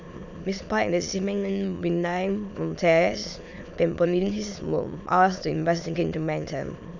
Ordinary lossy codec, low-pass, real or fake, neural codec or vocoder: none; 7.2 kHz; fake; autoencoder, 22.05 kHz, a latent of 192 numbers a frame, VITS, trained on many speakers